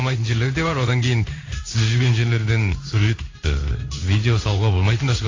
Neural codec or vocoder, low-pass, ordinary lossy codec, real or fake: codec, 16 kHz in and 24 kHz out, 1 kbps, XY-Tokenizer; 7.2 kHz; AAC, 32 kbps; fake